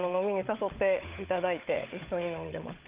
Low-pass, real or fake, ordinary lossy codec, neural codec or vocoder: 3.6 kHz; fake; Opus, 32 kbps; codec, 16 kHz, 16 kbps, FunCodec, trained on LibriTTS, 50 frames a second